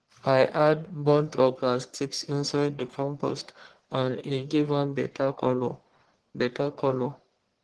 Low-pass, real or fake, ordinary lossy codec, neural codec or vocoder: 10.8 kHz; fake; Opus, 16 kbps; codec, 44.1 kHz, 1.7 kbps, Pupu-Codec